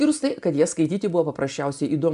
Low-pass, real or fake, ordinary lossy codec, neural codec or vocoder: 10.8 kHz; real; AAC, 96 kbps; none